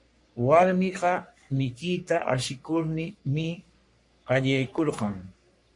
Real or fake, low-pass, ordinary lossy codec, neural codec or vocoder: fake; 10.8 kHz; MP3, 48 kbps; codec, 44.1 kHz, 3.4 kbps, Pupu-Codec